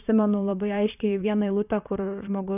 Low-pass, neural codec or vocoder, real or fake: 3.6 kHz; vocoder, 44.1 kHz, 128 mel bands, Pupu-Vocoder; fake